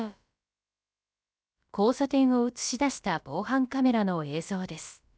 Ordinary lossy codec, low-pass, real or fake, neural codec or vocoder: none; none; fake; codec, 16 kHz, about 1 kbps, DyCAST, with the encoder's durations